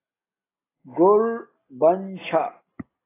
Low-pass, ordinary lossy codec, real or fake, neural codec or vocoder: 3.6 kHz; AAC, 16 kbps; real; none